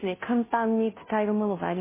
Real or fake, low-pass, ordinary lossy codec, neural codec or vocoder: fake; 3.6 kHz; MP3, 16 kbps; codec, 16 kHz, 0.5 kbps, FunCodec, trained on Chinese and English, 25 frames a second